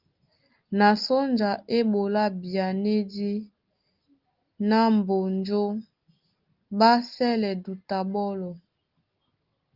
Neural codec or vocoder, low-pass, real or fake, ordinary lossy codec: none; 5.4 kHz; real; Opus, 32 kbps